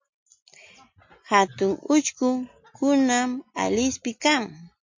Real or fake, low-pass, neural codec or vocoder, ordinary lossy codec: real; 7.2 kHz; none; MP3, 48 kbps